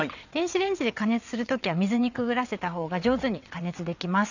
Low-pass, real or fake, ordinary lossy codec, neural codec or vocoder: 7.2 kHz; fake; none; vocoder, 22.05 kHz, 80 mel bands, WaveNeXt